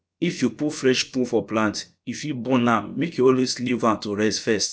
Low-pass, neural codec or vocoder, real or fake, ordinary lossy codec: none; codec, 16 kHz, about 1 kbps, DyCAST, with the encoder's durations; fake; none